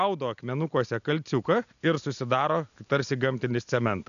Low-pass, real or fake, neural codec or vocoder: 7.2 kHz; real; none